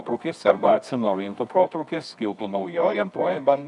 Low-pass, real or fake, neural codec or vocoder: 10.8 kHz; fake; codec, 24 kHz, 0.9 kbps, WavTokenizer, medium music audio release